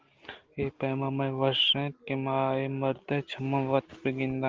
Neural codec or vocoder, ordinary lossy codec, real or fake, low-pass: none; Opus, 16 kbps; real; 7.2 kHz